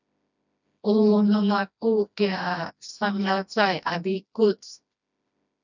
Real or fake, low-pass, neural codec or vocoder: fake; 7.2 kHz; codec, 16 kHz, 1 kbps, FreqCodec, smaller model